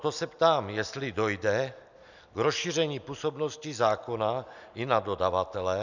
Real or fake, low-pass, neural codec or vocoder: real; 7.2 kHz; none